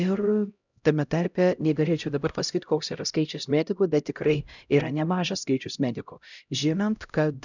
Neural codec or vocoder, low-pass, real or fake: codec, 16 kHz, 0.5 kbps, X-Codec, HuBERT features, trained on LibriSpeech; 7.2 kHz; fake